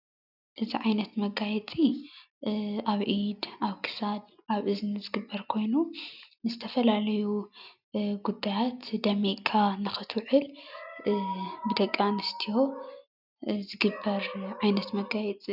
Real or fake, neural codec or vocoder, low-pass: real; none; 5.4 kHz